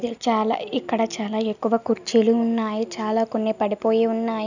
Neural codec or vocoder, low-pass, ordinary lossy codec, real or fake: none; 7.2 kHz; none; real